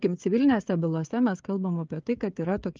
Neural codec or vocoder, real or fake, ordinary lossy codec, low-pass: codec, 16 kHz, 16 kbps, FreqCodec, smaller model; fake; Opus, 24 kbps; 7.2 kHz